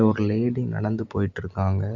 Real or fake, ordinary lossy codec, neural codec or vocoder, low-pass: real; Opus, 64 kbps; none; 7.2 kHz